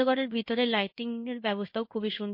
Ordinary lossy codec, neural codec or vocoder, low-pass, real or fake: MP3, 32 kbps; codec, 16 kHz in and 24 kHz out, 1 kbps, XY-Tokenizer; 5.4 kHz; fake